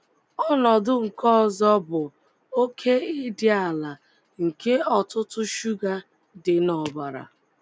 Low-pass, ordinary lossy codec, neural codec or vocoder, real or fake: none; none; none; real